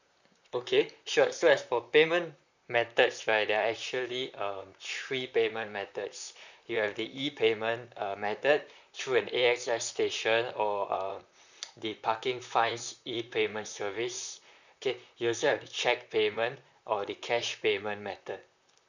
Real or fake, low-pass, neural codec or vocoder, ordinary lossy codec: fake; 7.2 kHz; vocoder, 44.1 kHz, 128 mel bands, Pupu-Vocoder; none